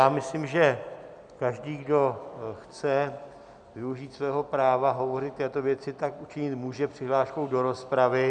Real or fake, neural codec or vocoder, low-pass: real; none; 9.9 kHz